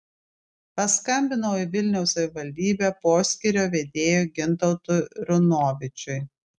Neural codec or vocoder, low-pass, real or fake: none; 10.8 kHz; real